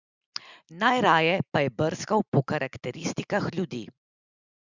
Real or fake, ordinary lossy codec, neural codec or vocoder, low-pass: real; Opus, 64 kbps; none; 7.2 kHz